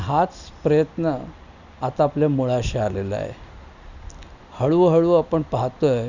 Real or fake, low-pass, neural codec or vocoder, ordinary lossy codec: real; 7.2 kHz; none; none